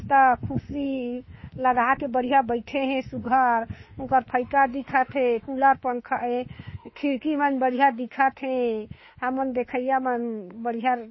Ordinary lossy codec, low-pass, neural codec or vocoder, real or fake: MP3, 24 kbps; 7.2 kHz; autoencoder, 48 kHz, 32 numbers a frame, DAC-VAE, trained on Japanese speech; fake